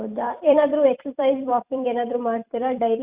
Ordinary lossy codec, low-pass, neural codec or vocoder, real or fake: Opus, 64 kbps; 3.6 kHz; vocoder, 44.1 kHz, 128 mel bands every 256 samples, BigVGAN v2; fake